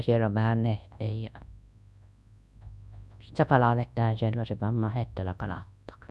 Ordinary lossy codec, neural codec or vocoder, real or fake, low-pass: none; codec, 24 kHz, 0.9 kbps, WavTokenizer, large speech release; fake; none